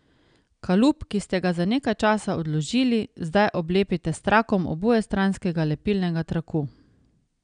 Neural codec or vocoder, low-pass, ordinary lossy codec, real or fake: none; 9.9 kHz; none; real